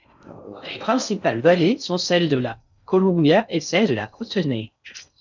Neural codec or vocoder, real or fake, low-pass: codec, 16 kHz in and 24 kHz out, 0.6 kbps, FocalCodec, streaming, 4096 codes; fake; 7.2 kHz